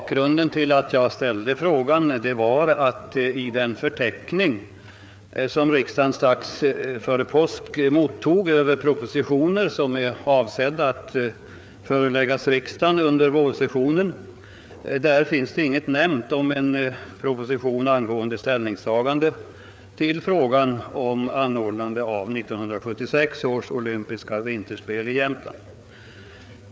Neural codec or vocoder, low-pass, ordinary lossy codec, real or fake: codec, 16 kHz, 4 kbps, FreqCodec, larger model; none; none; fake